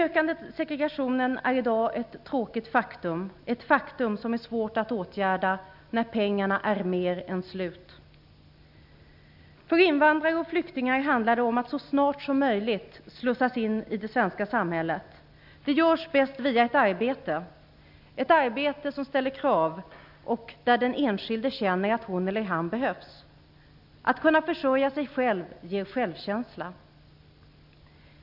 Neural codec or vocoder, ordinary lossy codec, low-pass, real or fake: none; none; 5.4 kHz; real